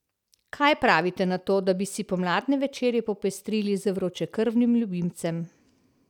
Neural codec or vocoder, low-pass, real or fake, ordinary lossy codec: none; 19.8 kHz; real; none